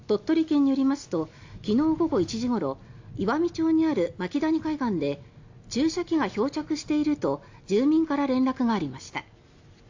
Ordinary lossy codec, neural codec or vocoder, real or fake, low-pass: AAC, 48 kbps; none; real; 7.2 kHz